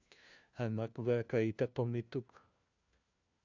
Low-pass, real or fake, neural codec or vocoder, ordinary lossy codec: 7.2 kHz; fake; codec, 16 kHz, 1 kbps, FunCodec, trained on LibriTTS, 50 frames a second; Opus, 64 kbps